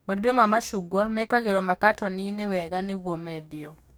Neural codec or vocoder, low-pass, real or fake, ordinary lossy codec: codec, 44.1 kHz, 2.6 kbps, DAC; none; fake; none